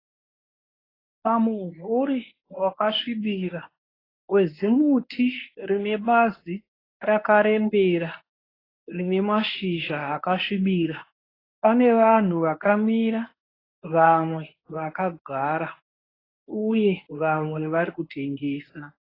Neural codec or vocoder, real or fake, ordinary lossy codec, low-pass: codec, 24 kHz, 0.9 kbps, WavTokenizer, medium speech release version 1; fake; AAC, 24 kbps; 5.4 kHz